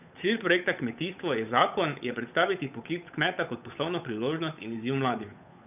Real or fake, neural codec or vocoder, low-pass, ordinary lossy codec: fake; codec, 16 kHz, 8 kbps, FunCodec, trained on Chinese and English, 25 frames a second; 3.6 kHz; none